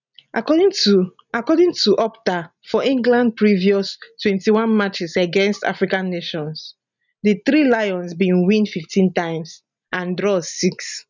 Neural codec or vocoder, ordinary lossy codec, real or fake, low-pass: none; none; real; 7.2 kHz